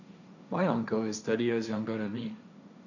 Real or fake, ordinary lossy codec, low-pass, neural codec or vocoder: fake; none; 7.2 kHz; codec, 16 kHz, 1.1 kbps, Voila-Tokenizer